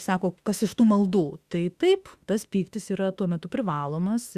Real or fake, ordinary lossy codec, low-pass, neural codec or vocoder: fake; Opus, 64 kbps; 14.4 kHz; autoencoder, 48 kHz, 32 numbers a frame, DAC-VAE, trained on Japanese speech